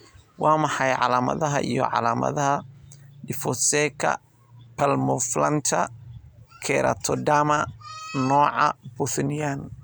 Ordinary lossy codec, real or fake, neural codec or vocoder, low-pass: none; real; none; none